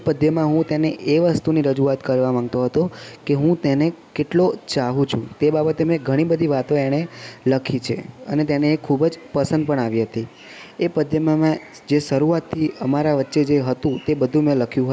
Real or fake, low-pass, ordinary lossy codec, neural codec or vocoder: real; none; none; none